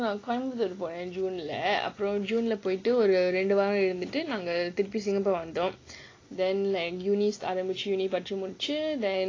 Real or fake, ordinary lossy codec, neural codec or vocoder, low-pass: real; AAC, 32 kbps; none; 7.2 kHz